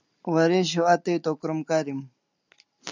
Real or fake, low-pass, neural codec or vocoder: real; 7.2 kHz; none